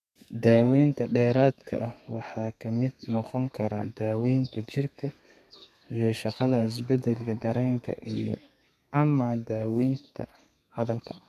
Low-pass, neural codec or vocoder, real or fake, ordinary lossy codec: 14.4 kHz; codec, 32 kHz, 1.9 kbps, SNAC; fake; none